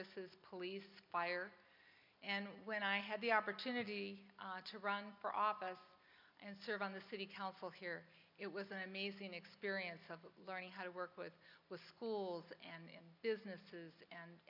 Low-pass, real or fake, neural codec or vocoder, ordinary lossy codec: 5.4 kHz; real; none; MP3, 48 kbps